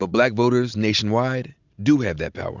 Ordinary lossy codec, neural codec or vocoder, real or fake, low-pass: Opus, 64 kbps; none; real; 7.2 kHz